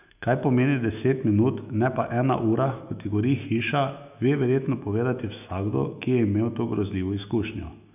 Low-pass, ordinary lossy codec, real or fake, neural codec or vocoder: 3.6 kHz; none; fake; autoencoder, 48 kHz, 128 numbers a frame, DAC-VAE, trained on Japanese speech